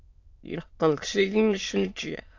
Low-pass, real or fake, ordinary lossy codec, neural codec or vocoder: 7.2 kHz; fake; AAC, 48 kbps; autoencoder, 22.05 kHz, a latent of 192 numbers a frame, VITS, trained on many speakers